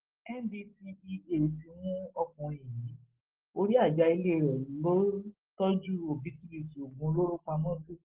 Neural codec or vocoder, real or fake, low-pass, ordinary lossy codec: none; real; 3.6 kHz; Opus, 16 kbps